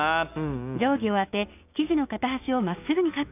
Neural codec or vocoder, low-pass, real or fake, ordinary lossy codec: codec, 16 kHz, 6 kbps, DAC; 3.6 kHz; fake; none